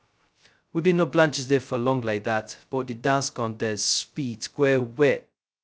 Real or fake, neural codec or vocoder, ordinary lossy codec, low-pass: fake; codec, 16 kHz, 0.2 kbps, FocalCodec; none; none